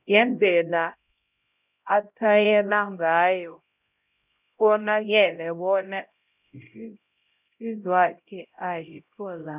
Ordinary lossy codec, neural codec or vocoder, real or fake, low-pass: none; codec, 16 kHz, 0.5 kbps, X-Codec, HuBERT features, trained on LibriSpeech; fake; 3.6 kHz